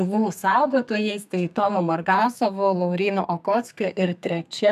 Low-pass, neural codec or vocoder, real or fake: 14.4 kHz; codec, 32 kHz, 1.9 kbps, SNAC; fake